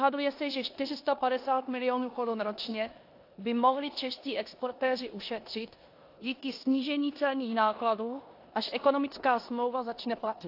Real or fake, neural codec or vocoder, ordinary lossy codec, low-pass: fake; codec, 16 kHz in and 24 kHz out, 0.9 kbps, LongCat-Audio-Codec, four codebook decoder; AAC, 32 kbps; 5.4 kHz